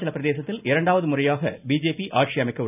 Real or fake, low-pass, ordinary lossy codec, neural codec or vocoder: real; 3.6 kHz; none; none